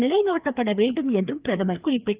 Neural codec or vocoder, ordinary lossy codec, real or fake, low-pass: codec, 16 kHz, 2 kbps, FreqCodec, larger model; Opus, 32 kbps; fake; 3.6 kHz